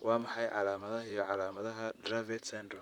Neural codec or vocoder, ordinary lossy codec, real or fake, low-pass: vocoder, 44.1 kHz, 128 mel bands, Pupu-Vocoder; none; fake; 19.8 kHz